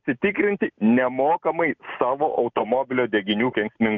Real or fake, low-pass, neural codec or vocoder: real; 7.2 kHz; none